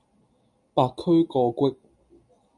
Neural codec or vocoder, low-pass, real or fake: none; 10.8 kHz; real